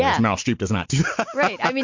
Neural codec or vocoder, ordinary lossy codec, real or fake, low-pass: none; MP3, 48 kbps; real; 7.2 kHz